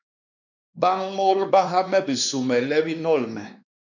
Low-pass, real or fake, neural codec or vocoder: 7.2 kHz; fake; codec, 16 kHz, 2 kbps, X-Codec, WavLM features, trained on Multilingual LibriSpeech